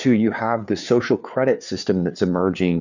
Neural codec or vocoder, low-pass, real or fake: codec, 16 kHz, 4 kbps, FreqCodec, larger model; 7.2 kHz; fake